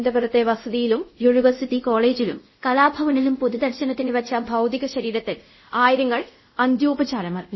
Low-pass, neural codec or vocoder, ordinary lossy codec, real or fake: 7.2 kHz; codec, 24 kHz, 0.5 kbps, DualCodec; MP3, 24 kbps; fake